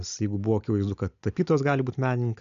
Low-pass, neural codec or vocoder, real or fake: 7.2 kHz; none; real